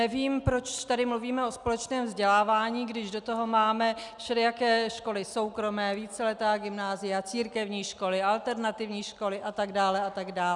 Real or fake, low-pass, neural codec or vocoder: real; 10.8 kHz; none